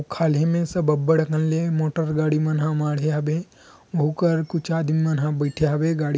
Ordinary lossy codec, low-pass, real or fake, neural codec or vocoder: none; none; real; none